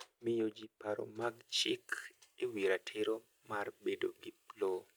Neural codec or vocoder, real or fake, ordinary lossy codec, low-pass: none; real; none; none